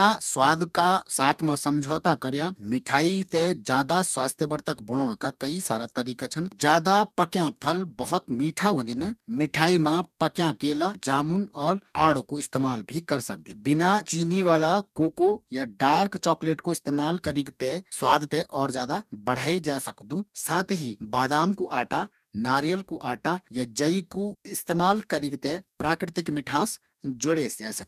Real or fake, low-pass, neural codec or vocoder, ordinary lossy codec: fake; 14.4 kHz; codec, 44.1 kHz, 2.6 kbps, DAC; none